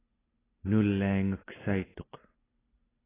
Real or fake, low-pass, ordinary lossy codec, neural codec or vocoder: real; 3.6 kHz; AAC, 16 kbps; none